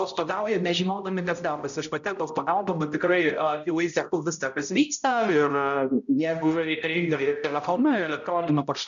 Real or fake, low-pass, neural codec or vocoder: fake; 7.2 kHz; codec, 16 kHz, 0.5 kbps, X-Codec, HuBERT features, trained on balanced general audio